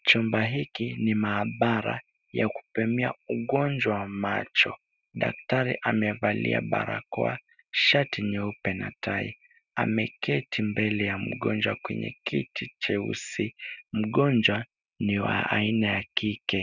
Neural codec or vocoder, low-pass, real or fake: none; 7.2 kHz; real